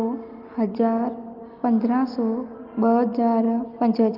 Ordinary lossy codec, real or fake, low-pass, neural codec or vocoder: Opus, 32 kbps; real; 5.4 kHz; none